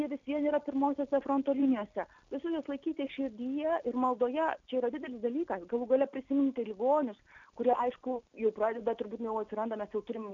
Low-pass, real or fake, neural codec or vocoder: 7.2 kHz; real; none